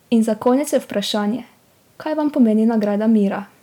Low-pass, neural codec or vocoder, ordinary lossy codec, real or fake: 19.8 kHz; none; none; real